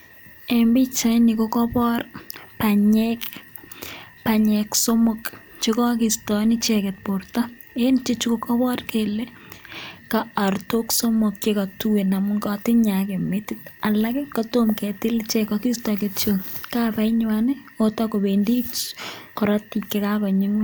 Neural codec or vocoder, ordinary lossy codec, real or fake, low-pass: none; none; real; none